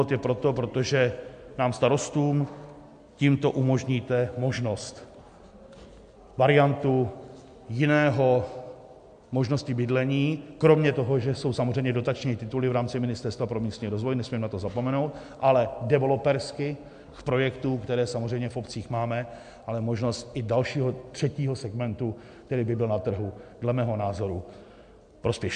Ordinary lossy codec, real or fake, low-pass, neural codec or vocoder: MP3, 64 kbps; real; 9.9 kHz; none